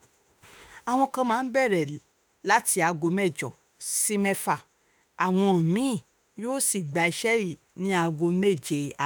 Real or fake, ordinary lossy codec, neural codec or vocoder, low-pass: fake; none; autoencoder, 48 kHz, 32 numbers a frame, DAC-VAE, trained on Japanese speech; none